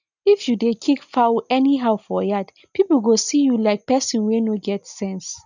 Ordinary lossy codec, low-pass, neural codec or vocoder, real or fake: none; 7.2 kHz; none; real